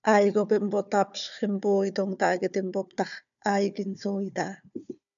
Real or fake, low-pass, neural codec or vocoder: fake; 7.2 kHz; codec, 16 kHz, 4 kbps, FunCodec, trained on Chinese and English, 50 frames a second